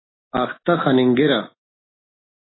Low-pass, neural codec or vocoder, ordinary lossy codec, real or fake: 7.2 kHz; none; AAC, 16 kbps; real